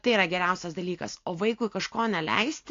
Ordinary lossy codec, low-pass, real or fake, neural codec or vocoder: AAC, 48 kbps; 7.2 kHz; real; none